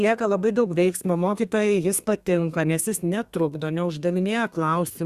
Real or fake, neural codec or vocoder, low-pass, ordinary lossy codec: fake; codec, 32 kHz, 1.9 kbps, SNAC; 14.4 kHz; Opus, 64 kbps